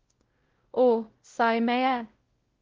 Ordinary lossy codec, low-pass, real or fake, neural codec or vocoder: Opus, 16 kbps; 7.2 kHz; fake; codec, 16 kHz, 0.5 kbps, X-Codec, WavLM features, trained on Multilingual LibriSpeech